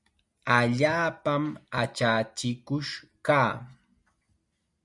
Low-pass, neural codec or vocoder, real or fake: 10.8 kHz; none; real